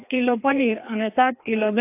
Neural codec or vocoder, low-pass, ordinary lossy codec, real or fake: codec, 16 kHz, 2 kbps, FreqCodec, larger model; 3.6 kHz; AAC, 24 kbps; fake